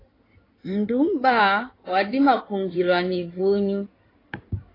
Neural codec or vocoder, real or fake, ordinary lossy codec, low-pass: codec, 16 kHz in and 24 kHz out, 2.2 kbps, FireRedTTS-2 codec; fake; AAC, 24 kbps; 5.4 kHz